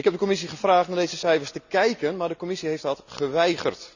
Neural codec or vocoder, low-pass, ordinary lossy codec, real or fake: none; 7.2 kHz; none; real